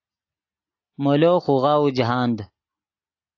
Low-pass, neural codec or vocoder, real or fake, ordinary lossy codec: 7.2 kHz; none; real; AAC, 48 kbps